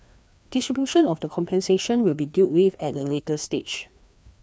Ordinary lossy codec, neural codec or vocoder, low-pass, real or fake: none; codec, 16 kHz, 2 kbps, FreqCodec, larger model; none; fake